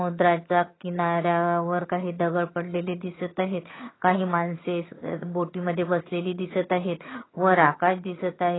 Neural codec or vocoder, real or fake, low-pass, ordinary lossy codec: vocoder, 22.05 kHz, 80 mel bands, HiFi-GAN; fake; 7.2 kHz; AAC, 16 kbps